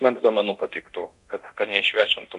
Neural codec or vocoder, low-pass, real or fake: codec, 24 kHz, 0.9 kbps, DualCodec; 10.8 kHz; fake